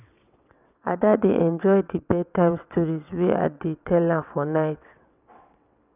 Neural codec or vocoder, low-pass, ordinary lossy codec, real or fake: vocoder, 22.05 kHz, 80 mel bands, WaveNeXt; 3.6 kHz; none; fake